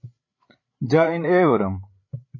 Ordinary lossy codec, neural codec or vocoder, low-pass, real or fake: MP3, 32 kbps; codec, 16 kHz, 8 kbps, FreqCodec, larger model; 7.2 kHz; fake